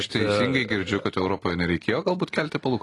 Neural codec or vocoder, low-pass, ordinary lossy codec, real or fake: none; 10.8 kHz; AAC, 32 kbps; real